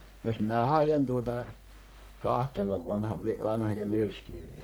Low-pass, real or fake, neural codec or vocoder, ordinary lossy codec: none; fake; codec, 44.1 kHz, 1.7 kbps, Pupu-Codec; none